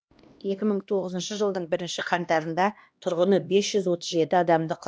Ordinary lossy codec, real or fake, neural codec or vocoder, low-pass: none; fake; codec, 16 kHz, 1 kbps, X-Codec, HuBERT features, trained on LibriSpeech; none